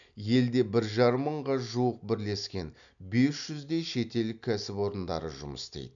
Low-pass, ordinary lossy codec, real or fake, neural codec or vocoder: 7.2 kHz; none; real; none